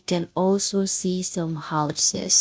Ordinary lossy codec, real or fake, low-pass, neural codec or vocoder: none; fake; none; codec, 16 kHz, 0.5 kbps, FunCodec, trained on Chinese and English, 25 frames a second